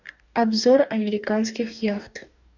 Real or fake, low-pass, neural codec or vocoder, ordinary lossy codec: fake; 7.2 kHz; codec, 44.1 kHz, 2.6 kbps, DAC; none